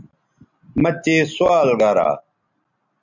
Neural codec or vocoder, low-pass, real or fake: none; 7.2 kHz; real